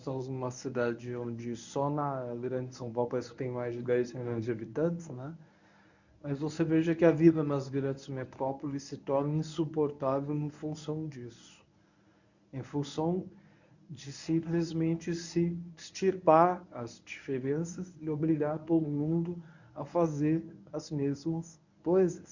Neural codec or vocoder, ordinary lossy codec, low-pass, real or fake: codec, 24 kHz, 0.9 kbps, WavTokenizer, medium speech release version 1; none; 7.2 kHz; fake